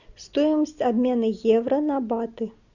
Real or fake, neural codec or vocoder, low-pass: real; none; 7.2 kHz